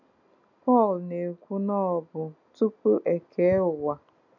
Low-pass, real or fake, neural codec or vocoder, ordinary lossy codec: 7.2 kHz; real; none; none